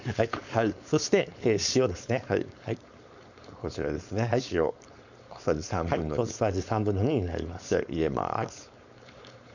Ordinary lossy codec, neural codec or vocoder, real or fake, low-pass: none; codec, 16 kHz, 4.8 kbps, FACodec; fake; 7.2 kHz